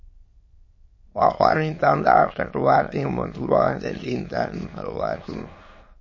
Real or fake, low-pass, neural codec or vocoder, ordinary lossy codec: fake; 7.2 kHz; autoencoder, 22.05 kHz, a latent of 192 numbers a frame, VITS, trained on many speakers; MP3, 32 kbps